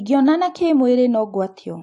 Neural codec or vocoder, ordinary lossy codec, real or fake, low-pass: none; AAC, 48 kbps; real; 10.8 kHz